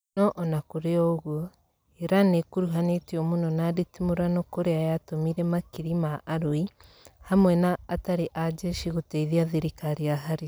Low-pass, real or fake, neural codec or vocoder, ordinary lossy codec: none; real; none; none